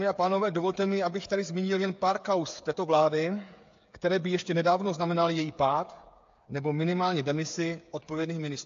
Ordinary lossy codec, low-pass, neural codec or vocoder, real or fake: AAC, 48 kbps; 7.2 kHz; codec, 16 kHz, 8 kbps, FreqCodec, smaller model; fake